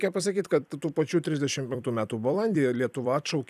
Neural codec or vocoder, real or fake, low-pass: vocoder, 44.1 kHz, 128 mel bands every 256 samples, BigVGAN v2; fake; 14.4 kHz